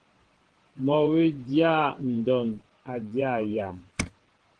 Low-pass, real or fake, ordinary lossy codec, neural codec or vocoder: 9.9 kHz; fake; Opus, 16 kbps; vocoder, 22.05 kHz, 80 mel bands, WaveNeXt